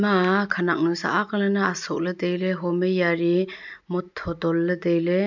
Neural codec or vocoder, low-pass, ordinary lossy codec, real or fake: none; 7.2 kHz; none; real